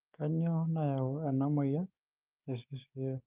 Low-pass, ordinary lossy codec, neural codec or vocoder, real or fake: 3.6 kHz; Opus, 32 kbps; codec, 16 kHz, 6 kbps, DAC; fake